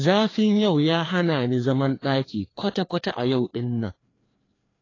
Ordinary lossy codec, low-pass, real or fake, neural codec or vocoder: AAC, 32 kbps; 7.2 kHz; fake; codec, 16 kHz, 2 kbps, FreqCodec, larger model